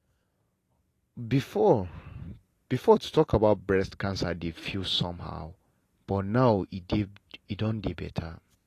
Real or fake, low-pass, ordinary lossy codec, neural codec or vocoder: real; 14.4 kHz; AAC, 48 kbps; none